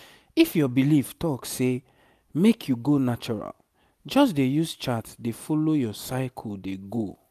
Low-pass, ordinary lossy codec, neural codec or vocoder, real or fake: 14.4 kHz; none; none; real